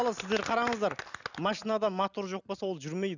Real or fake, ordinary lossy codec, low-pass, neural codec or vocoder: real; none; 7.2 kHz; none